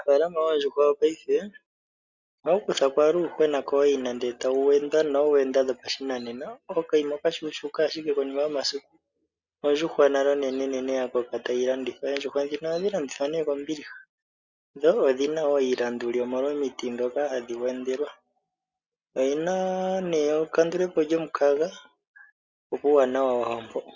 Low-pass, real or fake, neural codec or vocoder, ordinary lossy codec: 7.2 kHz; real; none; Opus, 64 kbps